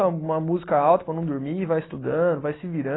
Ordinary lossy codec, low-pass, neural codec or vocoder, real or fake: AAC, 16 kbps; 7.2 kHz; none; real